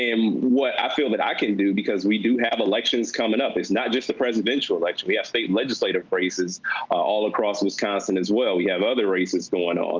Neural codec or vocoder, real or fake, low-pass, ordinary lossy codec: none; real; 7.2 kHz; Opus, 24 kbps